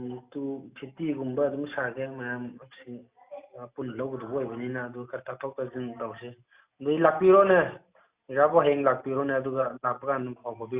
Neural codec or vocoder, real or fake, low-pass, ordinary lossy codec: none; real; 3.6 kHz; Opus, 64 kbps